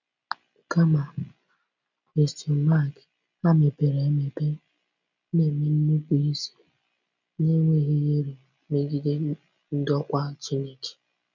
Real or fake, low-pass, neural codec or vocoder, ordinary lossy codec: real; 7.2 kHz; none; none